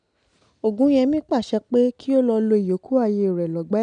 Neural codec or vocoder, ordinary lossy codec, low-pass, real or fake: none; none; 9.9 kHz; real